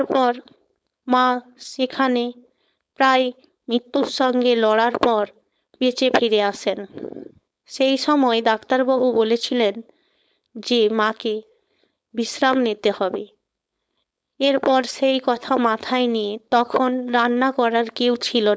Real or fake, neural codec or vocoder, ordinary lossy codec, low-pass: fake; codec, 16 kHz, 4.8 kbps, FACodec; none; none